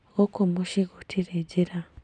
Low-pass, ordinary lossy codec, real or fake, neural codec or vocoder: 10.8 kHz; none; real; none